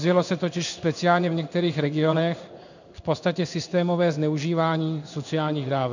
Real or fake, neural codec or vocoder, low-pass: fake; codec, 16 kHz in and 24 kHz out, 1 kbps, XY-Tokenizer; 7.2 kHz